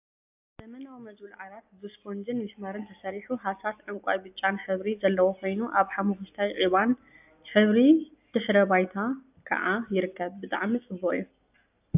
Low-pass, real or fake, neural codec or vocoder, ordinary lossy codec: 3.6 kHz; real; none; AAC, 32 kbps